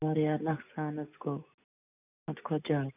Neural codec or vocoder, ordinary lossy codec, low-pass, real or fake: none; none; 3.6 kHz; real